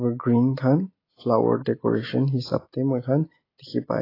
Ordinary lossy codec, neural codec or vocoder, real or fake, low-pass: AAC, 24 kbps; none; real; 5.4 kHz